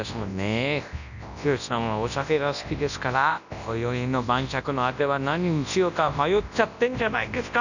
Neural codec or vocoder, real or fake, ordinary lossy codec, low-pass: codec, 24 kHz, 0.9 kbps, WavTokenizer, large speech release; fake; none; 7.2 kHz